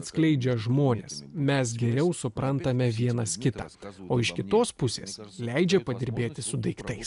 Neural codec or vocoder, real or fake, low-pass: none; real; 10.8 kHz